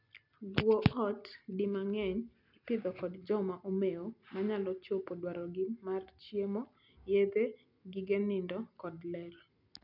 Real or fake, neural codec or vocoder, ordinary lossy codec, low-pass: real; none; none; 5.4 kHz